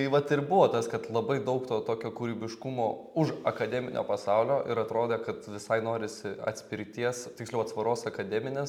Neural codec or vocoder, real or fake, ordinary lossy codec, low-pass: none; real; MP3, 96 kbps; 19.8 kHz